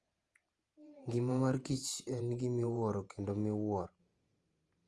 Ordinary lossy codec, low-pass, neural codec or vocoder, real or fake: Opus, 32 kbps; 10.8 kHz; vocoder, 48 kHz, 128 mel bands, Vocos; fake